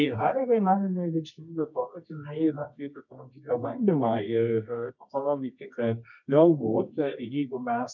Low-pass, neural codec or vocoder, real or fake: 7.2 kHz; codec, 24 kHz, 0.9 kbps, WavTokenizer, medium music audio release; fake